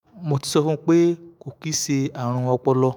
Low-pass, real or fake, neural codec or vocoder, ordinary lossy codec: none; fake; autoencoder, 48 kHz, 128 numbers a frame, DAC-VAE, trained on Japanese speech; none